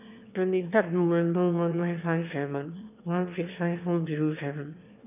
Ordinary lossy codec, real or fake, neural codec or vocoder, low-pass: none; fake; autoencoder, 22.05 kHz, a latent of 192 numbers a frame, VITS, trained on one speaker; 3.6 kHz